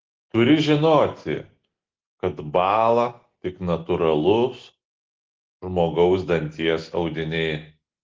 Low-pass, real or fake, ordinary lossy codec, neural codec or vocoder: 7.2 kHz; real; Opus, 16 kbps; none